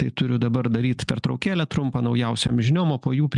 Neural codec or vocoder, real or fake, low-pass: none; real; 10.8 kHz